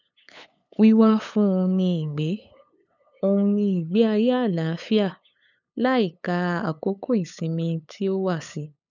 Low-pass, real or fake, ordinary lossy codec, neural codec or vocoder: 7.2 kHz; fake; none; codec, 16 kHz, 8 kbps, FunCodec, trained on LibriTTS, 25 frames a second